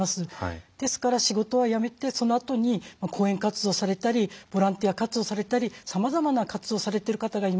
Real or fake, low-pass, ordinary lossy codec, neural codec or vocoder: real; none; none; none